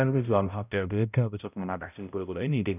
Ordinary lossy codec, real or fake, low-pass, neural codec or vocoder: none; fake; 3.6 kHz; codec, 16 kHz, 0.5 kbps, X-Codec, HuBERT features, trained on balanced general audio